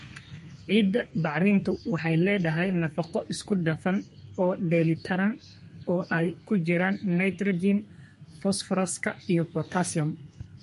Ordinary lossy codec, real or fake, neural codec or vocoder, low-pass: MP3, 48 kbps; fake; codec, 32 kHz, 1.9 kbps, SNAC; 14.4 kHz